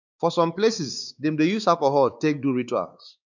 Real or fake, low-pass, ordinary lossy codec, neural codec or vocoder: fake; 7.2 kHz; none; codec, 16 kHz, 4 kbps, X-Codec, WavLM features, trained on Multilingual LibriSpeech